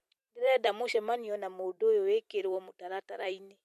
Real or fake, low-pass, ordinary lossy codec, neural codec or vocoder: fake; 14.4 kHz; MP3, 64 kbps; vocoder, 44.1 kHz, 128 mel bands every 256 samples, BigVGAN v2